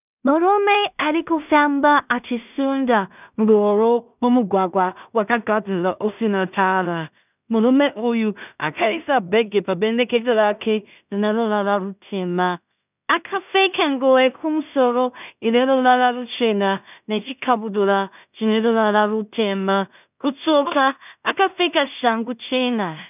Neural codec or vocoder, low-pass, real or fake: codec, 16 kHz in and 24 kHz out, 0.4 kbps, LongCat-Audio-Codec, two codebook decoder; 3.6 kHz; fake